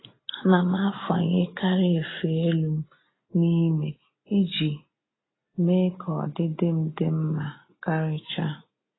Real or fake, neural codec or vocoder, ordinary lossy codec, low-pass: real; none; AAC, 16 kbps; 7.2 kHz